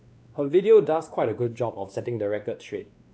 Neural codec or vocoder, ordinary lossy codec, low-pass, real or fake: codec, 16 kHz, 2 kbps, X-Codec, WavLM features, trained on Multilingual LibriSpeech; none; none; fake